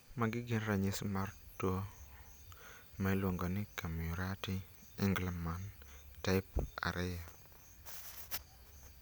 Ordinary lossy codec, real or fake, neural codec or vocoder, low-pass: none; real; none; none